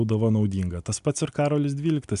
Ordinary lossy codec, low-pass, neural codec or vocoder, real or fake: MP3, 96 kbps; 10.8 kHz; none; real